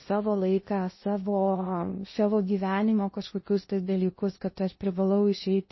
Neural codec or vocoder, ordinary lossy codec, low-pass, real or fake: codec, 16 kHz in and 24 kHz out, 0.6 kbps, FocalCodec, streaming, 2048 codes; MP3, 24 kbps; 7.2 kHz; fake